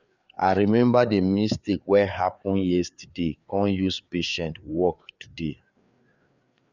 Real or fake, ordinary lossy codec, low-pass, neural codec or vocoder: fake; none; 7.2 kHz; codec, 16 kHz, 4 kbps, FreqCodec, larger model